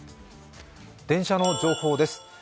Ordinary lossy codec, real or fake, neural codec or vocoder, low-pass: none; real; none; none